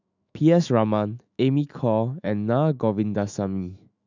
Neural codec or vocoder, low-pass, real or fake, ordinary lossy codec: autoencoder, 48 kHz, 128 numbers a frame, DAC-VAE, trained on Japanese speech; 7.2 kHz; fake; none